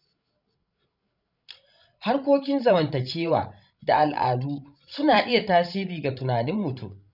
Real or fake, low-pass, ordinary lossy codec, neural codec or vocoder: fake; 5.4 kHz; none; codec, 16 kHz, 16 kbps, FreqCodec, larger model